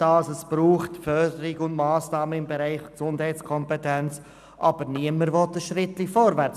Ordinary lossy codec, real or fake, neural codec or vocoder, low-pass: none; real; none; 14.4 kHz